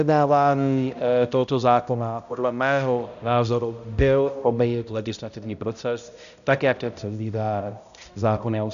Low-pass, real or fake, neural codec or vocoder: 7.2 kHz; fake; codec, 16 kHz, 0.5 kbps, X-Codec, HuBERT features, trained on balanced general audio